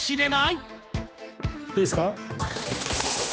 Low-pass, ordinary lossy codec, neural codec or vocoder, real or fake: none; none; codec, 16 kHz, 2 kbps, X-Codec, HuBERT features, trained on general audio; fake